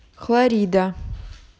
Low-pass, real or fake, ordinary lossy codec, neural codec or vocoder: none; real; none; none